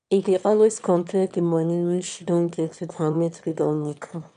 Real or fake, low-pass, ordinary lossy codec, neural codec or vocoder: fake; 9.9 kHz; MP3, 96 kbps; autoencoder, 22.05 kHz, a latent of 192 numbers a frame, VITS, trained on one speaker